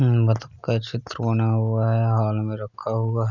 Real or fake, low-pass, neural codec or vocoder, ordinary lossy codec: real; 7.2 kHz; none; none